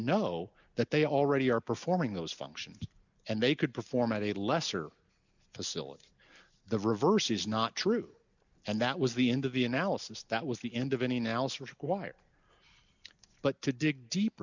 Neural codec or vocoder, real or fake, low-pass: none; real; 7.2 kHz